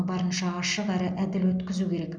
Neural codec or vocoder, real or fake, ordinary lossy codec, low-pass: none; real; none; 9.9 kHz